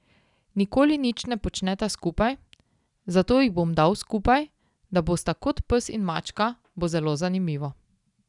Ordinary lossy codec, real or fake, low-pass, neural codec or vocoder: none; real; 10.8 kHz; none